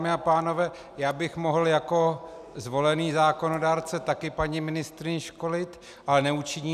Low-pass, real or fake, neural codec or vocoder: 14.4 kHz; real; none